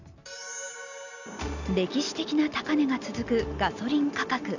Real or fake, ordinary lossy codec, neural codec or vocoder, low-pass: real; none; none; 7.2 kHz